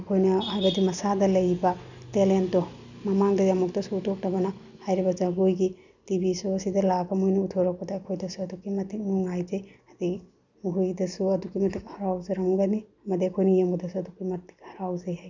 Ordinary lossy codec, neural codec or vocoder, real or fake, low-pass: none; none; real; 7.2 kHz